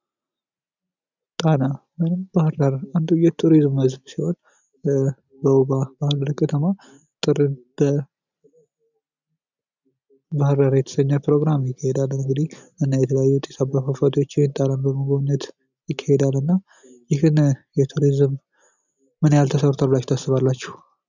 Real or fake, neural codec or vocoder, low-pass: real; none; 7.2 kHz